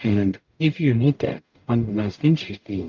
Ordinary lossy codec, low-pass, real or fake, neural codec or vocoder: Opus, 24 kbps; 7.2 kHz; fake; codec, 44.1 kHz, 0.9 kbps, DAC